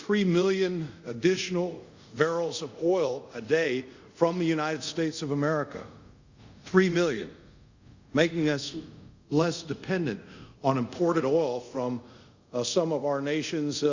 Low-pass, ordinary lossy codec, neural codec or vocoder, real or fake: 7.2 kHz; Opus, 64 kbps; codec, 24 kHz, 0.5 kbps, DualCodec; fake